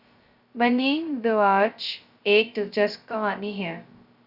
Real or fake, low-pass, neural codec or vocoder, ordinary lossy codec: fake; 5.4 kHz; codec, 16 kHz, 0.2 kbps, FocalCodec; Opus, 64 kbps